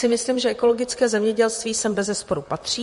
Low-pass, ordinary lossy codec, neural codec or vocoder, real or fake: 14.4 kHz; MP3, 48 kbps; vocoder, 44.1 kHz, 128 mel bands, Pupu-Vocoder; fake